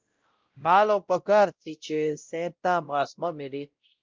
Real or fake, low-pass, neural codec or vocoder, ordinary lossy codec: fake; 7.2 kHz; codec, 16 kHz, 0.5 kbps, X-Codec, WavLM features, trained on Multilingual LibriSpeech; Opus, 32 kbps